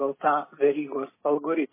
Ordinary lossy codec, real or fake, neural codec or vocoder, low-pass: MP3, 16 kbps; real; none; 3.6 kHz